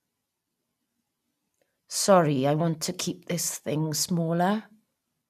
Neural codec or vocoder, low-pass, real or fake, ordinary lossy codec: none; 14.4 kHz; real; none